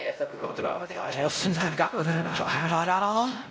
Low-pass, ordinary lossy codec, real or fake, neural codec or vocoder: none; none; fake; codec, 16 kHz, 0.5 kbps, X-Codec, WavLM features, trained on Multilingual LibriSpeech